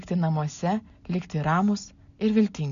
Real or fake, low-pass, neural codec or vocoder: real; 7.2 kHz; none